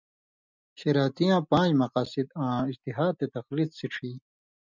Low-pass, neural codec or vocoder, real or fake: 7.2 kHz; none; real